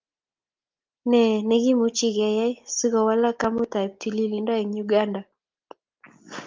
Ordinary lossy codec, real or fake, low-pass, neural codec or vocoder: Opus, 24 kbps; real; 7.2 kHz; none